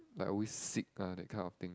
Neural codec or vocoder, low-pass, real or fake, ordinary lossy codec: none; none; real; none